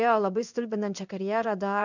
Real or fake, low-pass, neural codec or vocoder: fake; 7.2 kHz; codec, 16 kHz in and 24 kHz out, 1 kbps, XY-Tokenizer